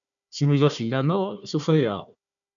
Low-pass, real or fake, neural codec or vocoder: 7.2 kHz; fake; codec, 16 kHz, 1 kbps, FunCodec, trained on Chinese and English, 50 frames a second